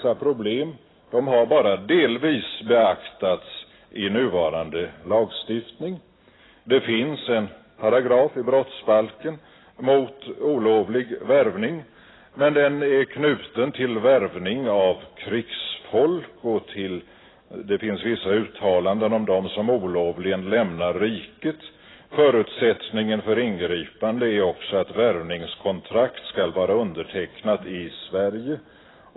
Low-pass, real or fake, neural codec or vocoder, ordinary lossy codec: 7.2 kHz; real; none; AAC, 16 kbps